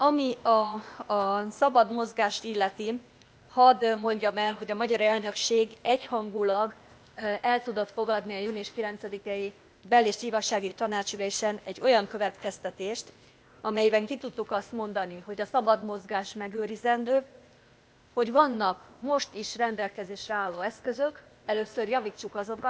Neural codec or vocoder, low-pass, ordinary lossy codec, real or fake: codec, 16 kHz, 0.8 kbps, ZipCodec; none; none; fake